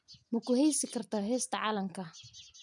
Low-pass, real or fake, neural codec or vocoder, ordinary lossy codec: 10.8 kHz; real; none; none